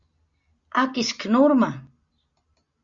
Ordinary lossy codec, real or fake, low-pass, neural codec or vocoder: Opus, 64 kbps; real; 7.2 kHz; none